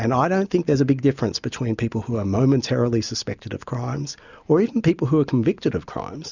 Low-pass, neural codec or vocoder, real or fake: 7.2 kHz; none; real